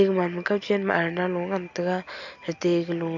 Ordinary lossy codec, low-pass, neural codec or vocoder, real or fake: AAC, 48 kbps; 7.2 kHz; none; real